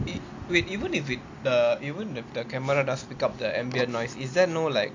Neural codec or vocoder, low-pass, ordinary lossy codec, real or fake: none; 7.2 kHz; AAC, 48 kbps; real